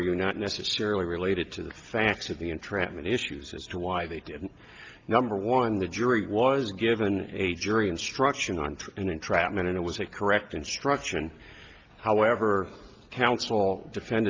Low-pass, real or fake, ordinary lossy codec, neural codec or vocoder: 7.2 kHz; real; Opus, 32 kbps; none